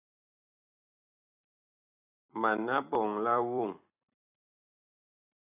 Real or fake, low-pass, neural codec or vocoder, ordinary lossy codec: fake; 3.6 kHz; vocoder, 44.1 kHz, 128 mel bands every 256 samples, BigVGAN v2; AAC, 24 kbps